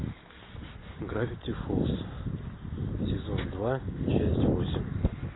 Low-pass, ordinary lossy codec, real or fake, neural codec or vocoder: 7.2 kHz; AAC, 16 kbps; real; none